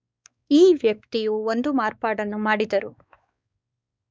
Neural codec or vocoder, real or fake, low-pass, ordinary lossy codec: codec, 16 kHz, 4 kbps, X-Codec, WavLM features, trained on Multilingual LibriSpeech; fake; none; none